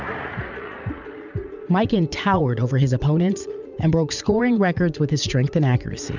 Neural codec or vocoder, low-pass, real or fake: vocoder, 44.1 kHz, 80 mel bands, Vocos; 7.2 kHz; fake